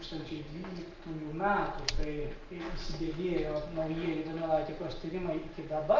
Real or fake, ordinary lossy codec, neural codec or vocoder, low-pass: real; Opus, 32 kbps; none; 7.2 kHz